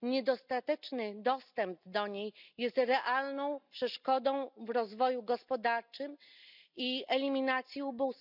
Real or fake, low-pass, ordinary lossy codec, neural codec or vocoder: real; 5.4 kHz; none; none